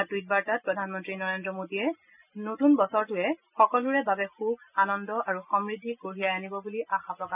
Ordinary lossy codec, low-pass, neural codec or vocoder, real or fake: none; 3.6 kHz; none; real